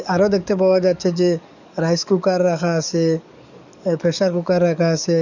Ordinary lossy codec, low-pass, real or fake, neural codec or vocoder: none; 7.2 kHz; fake; codec, 44.1 kHz, 7.8 kbps, DAC